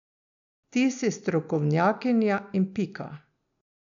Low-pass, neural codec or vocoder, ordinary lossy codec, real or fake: 7.2 kHz; none; none; real